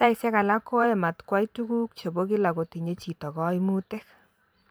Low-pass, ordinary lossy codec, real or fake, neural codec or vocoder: none; none; fake; vocoder, 44.1 kHz, 128 mel bands every 512 samples, BigVGAN v2